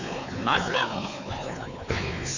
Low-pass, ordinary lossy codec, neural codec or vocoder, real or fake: 7.2 kHz; none; codec, 16 kHz, 4 kbps, X-Codec, WavLM features, trained on Multilingual LibriSpeech; fake